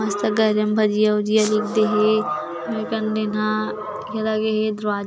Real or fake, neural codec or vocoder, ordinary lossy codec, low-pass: real; none; none; none